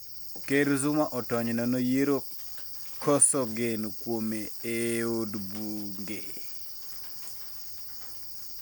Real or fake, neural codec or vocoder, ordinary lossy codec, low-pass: real; none; none; none